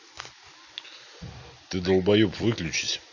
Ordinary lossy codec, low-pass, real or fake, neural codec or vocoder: none; 7.2 kHz; real; none